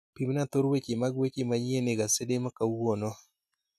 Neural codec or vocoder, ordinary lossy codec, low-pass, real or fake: none; none; 14.4 kHz; real